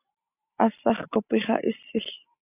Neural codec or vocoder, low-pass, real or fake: none; 3.6 kHz; real